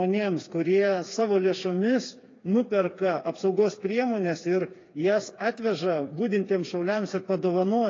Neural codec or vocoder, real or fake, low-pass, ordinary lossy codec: codec, 16 kHz, 4 kbps, FreqCodec, smaller model; fake; 7.2 kHz; AAC, 32 kbps